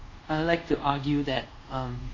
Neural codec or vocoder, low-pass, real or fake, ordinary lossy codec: codec, 24 kHz, 0.5 kbps, DualCodec; 7.2 kHz; fake; MP3, 32 kbps